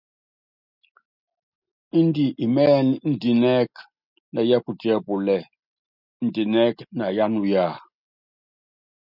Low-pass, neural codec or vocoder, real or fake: 5.4 kHz; none; real